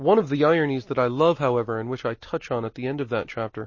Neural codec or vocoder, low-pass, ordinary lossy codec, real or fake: none; 7.2 kHz; MP3, 32 kbps; real